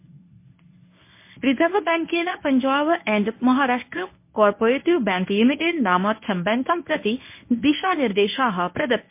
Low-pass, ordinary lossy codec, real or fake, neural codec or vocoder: 3.6 kHz; MP3, 24 kbps; fake; codec, 24 kHz, 0.9 kbps, WavTokenizer, medium speech release version 1